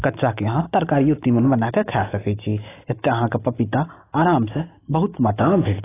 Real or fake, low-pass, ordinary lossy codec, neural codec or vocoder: real; 3.6 kHz; AAC, 16 kbps; none